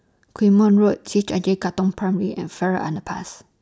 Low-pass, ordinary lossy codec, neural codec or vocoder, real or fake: none; none; none; real